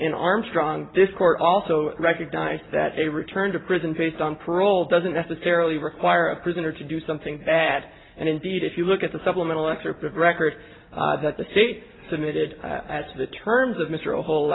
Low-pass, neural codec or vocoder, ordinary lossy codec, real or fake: 7.2 kHz; none; AAC, 16 kbps; real